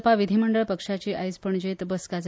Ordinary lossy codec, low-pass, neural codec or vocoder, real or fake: none; none; none; real